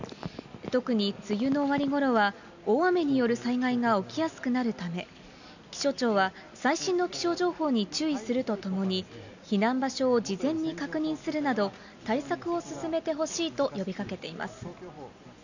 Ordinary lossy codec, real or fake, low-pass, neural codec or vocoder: none; real; 7.2 kHz; none